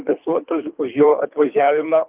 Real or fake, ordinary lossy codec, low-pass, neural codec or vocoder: fake; Opus, 24 kbps; 3.6 kHz; codec, 24 kHz, 3 kbps, HILCodec